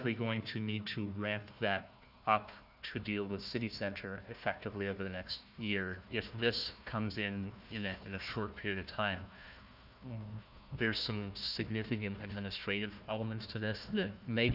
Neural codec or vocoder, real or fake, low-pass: codec, 16 kHz, 1 kbps, FunCodec, trained on Chinese and English, 50 frames a second; fake; 5.4 kHz